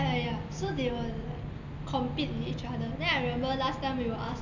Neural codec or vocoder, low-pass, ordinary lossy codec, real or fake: none; 7.2 kHz; none; real